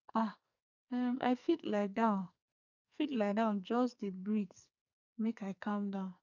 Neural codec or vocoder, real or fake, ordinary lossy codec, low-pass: codec, 44.1 kHz, 2.6 kbps, SNAC; fake; none; 7.2 kHz